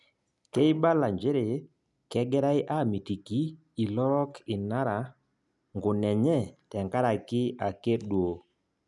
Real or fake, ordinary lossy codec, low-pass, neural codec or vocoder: real; none; 10.8 kHz; none